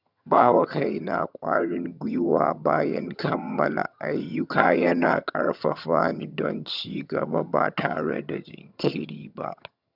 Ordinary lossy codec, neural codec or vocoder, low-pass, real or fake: none; vocoder, 22.05 kHz, 80 mel bands, HiFi-GAN; 5.4 kHz; fake